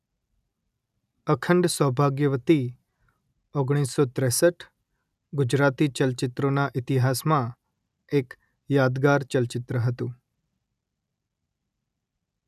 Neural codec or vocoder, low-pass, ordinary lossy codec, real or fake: none; 14.4 kHz; none; real